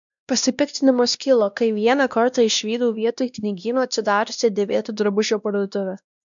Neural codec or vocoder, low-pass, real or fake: codec, 16 kHz, 1 kbps, X-Codec, WavLM features, trained on Multilingual LibriSpeech; 7.2 kHz; fake